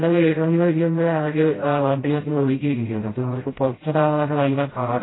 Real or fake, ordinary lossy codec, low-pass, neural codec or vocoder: fake; AAC, 16 kbps; 7.2 kHz; codec, 16 kHz, 0.5 kbps, FreqCodec, smaller model